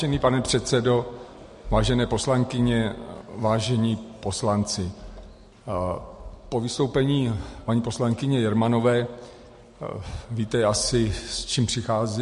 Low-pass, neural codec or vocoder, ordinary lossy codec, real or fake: 14.4 kHz; none; MP3, 48 kbps; real